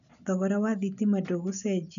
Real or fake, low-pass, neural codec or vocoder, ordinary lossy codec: real; 7.2 kHz; none; none